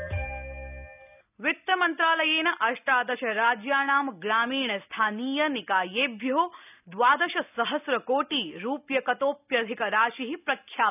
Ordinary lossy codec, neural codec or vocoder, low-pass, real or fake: none; none; 3.6 kHz; real